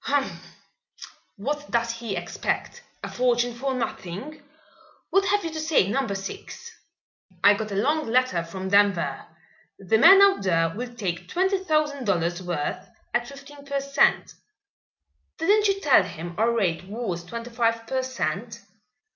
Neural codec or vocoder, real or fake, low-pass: none; real; 7.2 kHz